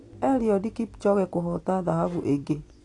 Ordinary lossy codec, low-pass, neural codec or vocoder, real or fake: AAC, 48 kbps; 10.8 kHz; none; real